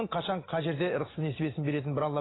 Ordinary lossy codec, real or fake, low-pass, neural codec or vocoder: AAC, 16 kbps; real; 7.2 kHz; none